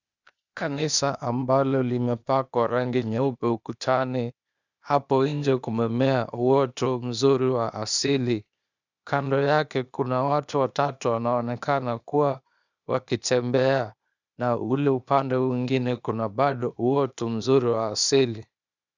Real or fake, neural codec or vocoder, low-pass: fake; codec, 16 kHz, 0.8 kbps, ZipCodec; 7.2 kHz